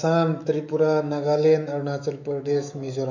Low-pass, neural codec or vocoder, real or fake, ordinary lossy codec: 7.2 kHz; autoencoder, 48 kHz, 128 numbers a frame, DAC-VAE, trained on Japanese speech; fake; none